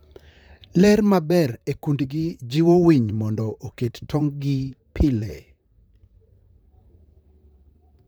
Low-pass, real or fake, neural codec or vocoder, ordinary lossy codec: none; fake; vocoder, 44.1 kHz, 128 mel bands, Pupu-Vocoder; none